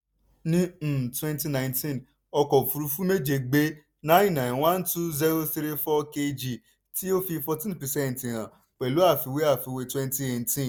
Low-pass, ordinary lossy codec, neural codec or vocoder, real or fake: none; none; none; real